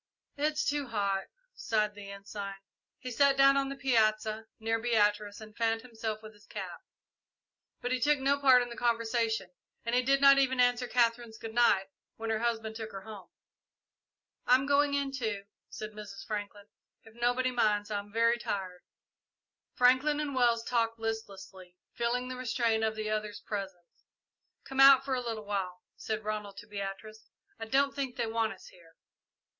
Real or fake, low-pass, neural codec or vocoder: real; 7.2 kHz; none